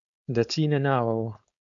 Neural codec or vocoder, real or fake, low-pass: codec, 16 kHz, 4.8 kbps, FACodec; fake; 7.2 kHz